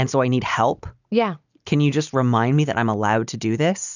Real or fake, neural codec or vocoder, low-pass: real; none; 7.2 kHz